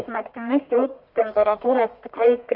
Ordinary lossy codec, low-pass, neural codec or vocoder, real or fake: Opus, 64 kbps; 5.4 kHz; codec, 44.1 kHz, 1.7 kbps, Pupu-Codec; fake